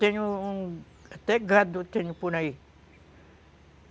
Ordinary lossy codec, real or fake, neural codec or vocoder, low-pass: none; real; none; none